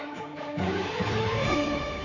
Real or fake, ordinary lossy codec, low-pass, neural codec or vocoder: fake; none; 7.2 kHz; codec, 24 kHz, 3.1 kbps, DualCodec